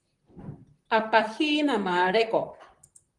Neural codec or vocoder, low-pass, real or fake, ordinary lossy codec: vocoder, 44.1 kHz, 128 mel bands, Pupu-Vocoder; 10.8 kHz; fake; Opus, 24 kbps